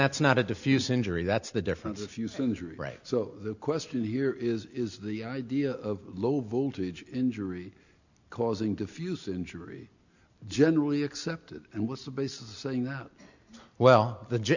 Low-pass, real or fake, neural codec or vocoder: 7.2 kHz; real; none